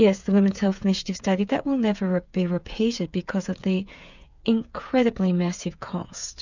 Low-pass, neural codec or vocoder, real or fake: 7.2 kHz; codec, 16 kHz, 8 kbps, FreqCodec, smaller model; fake